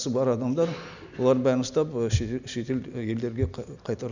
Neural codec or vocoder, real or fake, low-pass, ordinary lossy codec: none; real; 7.2 kHz; none